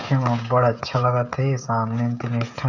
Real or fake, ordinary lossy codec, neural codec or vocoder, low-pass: fake; none; codec, 16 kHz, 16 kbps, FreqCodec, smaller model; 7.2 kHz